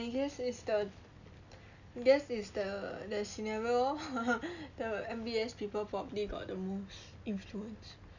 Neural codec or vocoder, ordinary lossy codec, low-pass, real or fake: none; none; 7.2 kHz; real